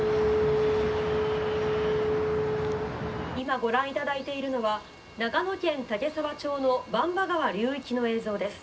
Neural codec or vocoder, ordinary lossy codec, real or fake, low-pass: none; none; real; none